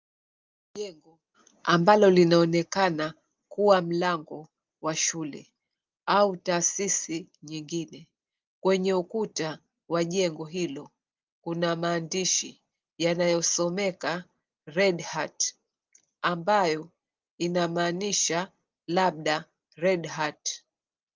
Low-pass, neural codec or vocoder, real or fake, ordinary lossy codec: 7.2 kHz; none; real; Opus, 24 kbps